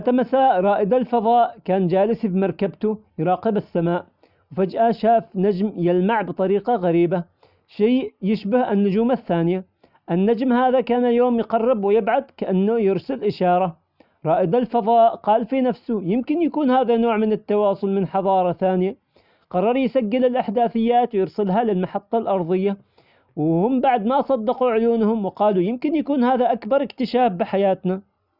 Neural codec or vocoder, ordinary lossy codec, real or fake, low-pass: none; none; real; 5.4 kHz